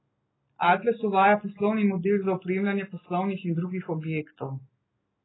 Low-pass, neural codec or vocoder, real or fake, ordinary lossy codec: 7.2 kHz; none; real; AAC, 16 kbps